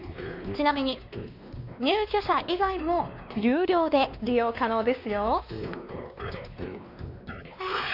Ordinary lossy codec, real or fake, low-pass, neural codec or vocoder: none; fake; 5.4 kHz; codec, 16 kHz, 2 kbps, X-Codec, WavLM features, trained on Multilingual LibriSpeech